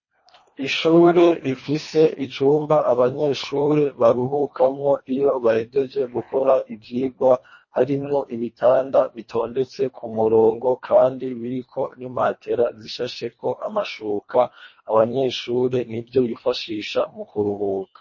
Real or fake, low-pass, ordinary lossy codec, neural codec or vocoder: fake; 7.2 kHz; MP3, 32 kbps; codec, 24 kHz, 1.5 kbps, HILCodec